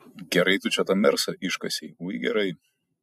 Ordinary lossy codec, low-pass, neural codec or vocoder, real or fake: MP3, 96 kbps; 14.4 kHz; none; real